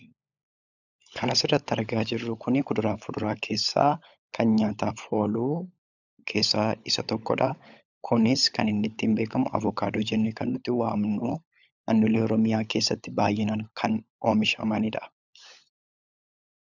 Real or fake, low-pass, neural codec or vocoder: fake; 7.2 kHz; codec, 16 kHz, 16 kbps, FunCodec, trained on LibriTTS, 50 frames a second